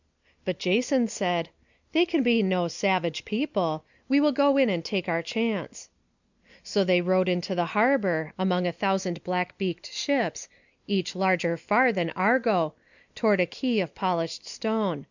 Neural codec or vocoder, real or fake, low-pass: none; real; 7.2 kHz